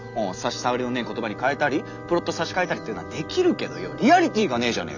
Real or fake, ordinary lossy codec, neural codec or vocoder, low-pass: real; none; none; 7.2 kHz